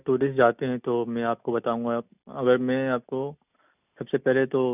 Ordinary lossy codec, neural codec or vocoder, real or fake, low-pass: none; none; real; 3.6 kHz